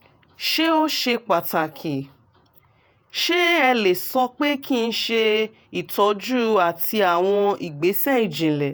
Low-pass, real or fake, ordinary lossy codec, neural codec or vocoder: none; fake; none; vocoder, 48 kHz, 128 mel bands, Vocos